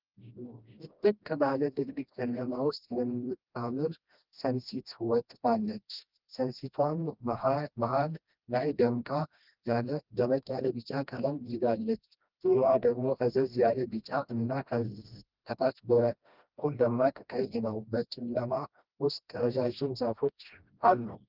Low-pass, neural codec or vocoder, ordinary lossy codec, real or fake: 5.4 kHz; codec, 16 kHz, 1 kbps, FreqCodec, smaller model; Opus, 24 kbps; fake